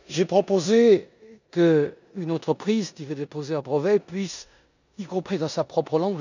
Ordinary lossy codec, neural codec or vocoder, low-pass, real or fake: none; codec, 16 kHz in and 24 kHz out, 0.9 kbps, LongCat-Audio-Codec, four codebook decoder; 7.2 kHz; fake